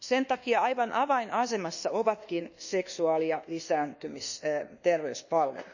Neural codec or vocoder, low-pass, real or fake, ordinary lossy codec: codec, 24 kHz, 1.2 kbps, DualCodec; 7.2 kHz; fake; none